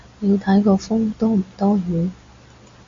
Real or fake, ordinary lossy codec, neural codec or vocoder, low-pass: real; AAC, 32 kbps; none; 7.2 kHz